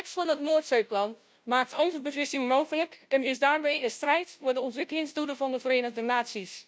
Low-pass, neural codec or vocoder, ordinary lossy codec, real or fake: none; codec, 16 kHz, 0.5 kbps, FunCodec, trained on Chinese and English, 25 frames a second; none; fake